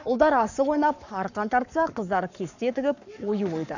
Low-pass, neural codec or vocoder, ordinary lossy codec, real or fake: 7.2 kHz; codec, 44.1 kHz, 7.8 kbps, Pupu-Codec; none; fake